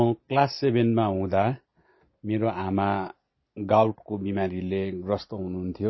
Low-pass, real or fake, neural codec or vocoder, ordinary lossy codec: 7.2 kHz; real; none; MP3, 24 kbps